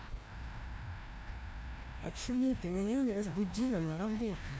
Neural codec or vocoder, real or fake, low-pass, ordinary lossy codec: codec, 16 kHz, 1 kbps, FreqCodec, larger model; fake; none; none